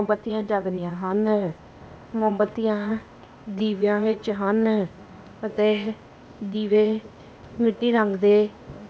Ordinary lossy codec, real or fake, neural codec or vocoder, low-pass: none; fake; codec, 16 kHz, 0.8 kbps, ZipCodec; none